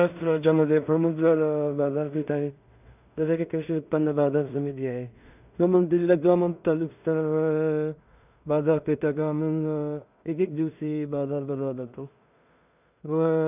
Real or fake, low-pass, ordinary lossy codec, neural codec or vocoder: fake; 3.6 kHz; none; codec, 16 kHz in and 24 kHz out, 0.4 kbps, LongCat-Audio-Codec, two codebook decoder